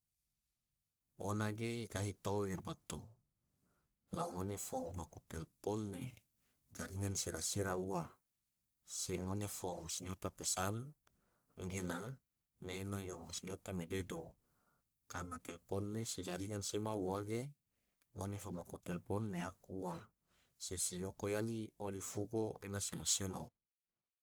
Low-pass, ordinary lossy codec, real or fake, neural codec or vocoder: none; none; fake; codec, 44.1 kHz, 1.7 kbps, Pupu-Codec